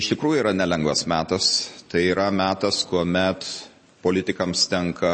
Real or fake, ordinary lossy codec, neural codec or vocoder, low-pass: real; MP3, 32 kbps; none; 9.9 kHz